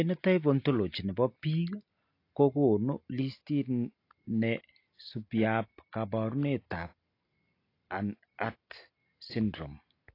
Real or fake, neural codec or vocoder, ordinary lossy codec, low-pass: real; none; AAC, 32 kbps; 5.4 kHz